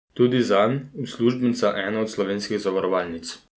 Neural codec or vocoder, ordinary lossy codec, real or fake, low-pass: none; none; real; none